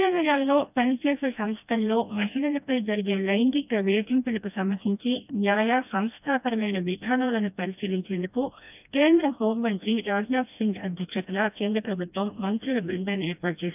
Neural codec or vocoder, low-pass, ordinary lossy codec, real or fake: codec, 16 kHz, 1 kbps, FreqCodec, smaller model; 3.6 kHz; none; fake